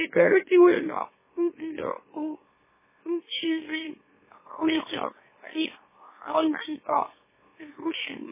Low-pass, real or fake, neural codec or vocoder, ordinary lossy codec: 3.6 kHz; fake; autoencoder, 44.1 kHz, a latent of 192 numbers a frame, MeloTTS; MP3, 16 kbps